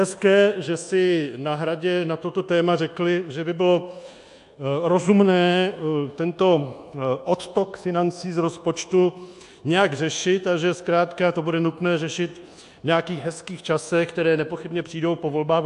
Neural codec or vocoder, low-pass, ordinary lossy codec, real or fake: codec, 24 kHz, 1.2 kbps, DualCodec; 10.8 kHz; AAC, 64 kbps; fake